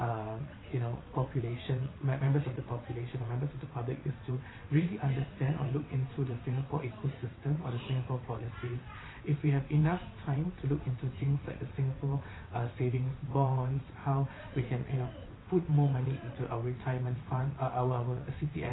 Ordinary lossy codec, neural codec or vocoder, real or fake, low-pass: AAC, 16 kbps; vocoder, 22.05 kHz, 80 mel bands, WaveNeXt; fake; 7.2 kHz